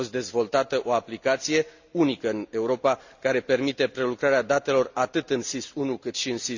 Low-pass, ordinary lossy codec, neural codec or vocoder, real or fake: 7.2 kHz; Opus, 64 kbps; none; real